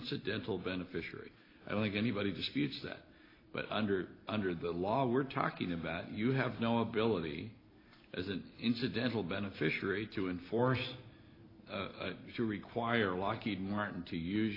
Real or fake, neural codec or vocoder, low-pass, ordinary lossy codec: real; none; 5.4 kHz; AAC, 24 kbps